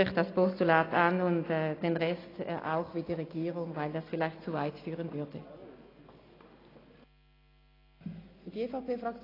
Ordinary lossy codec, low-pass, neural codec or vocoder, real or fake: AAC, 24 kbps; 5.4 kHz; none; real